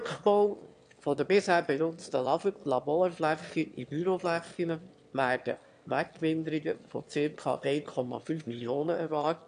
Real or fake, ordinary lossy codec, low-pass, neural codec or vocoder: fake; AAC, 64 kbps; 9.9 kHz; autoencoder, 22.05 kHz, a latent of 192 numbers a frame, VITS, trained on one speaker